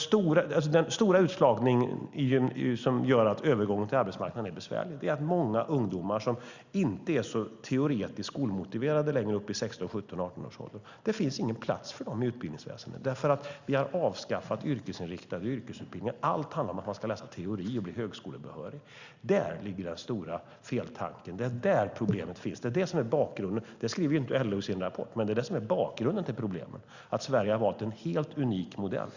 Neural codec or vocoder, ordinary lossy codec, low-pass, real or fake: none; Opus, 64 kbps; 7.2 kHz; real